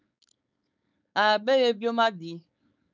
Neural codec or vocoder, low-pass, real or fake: codec, 16 kHz, 4.8 kbps, FACodec; 7.2 kHz; fake